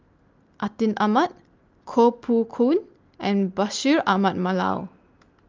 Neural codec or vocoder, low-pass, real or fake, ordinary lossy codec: none; 7.2 kHz; real; Opus, 24 kbps